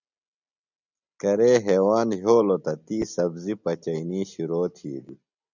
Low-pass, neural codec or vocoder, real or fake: 7.2 kHz; none; real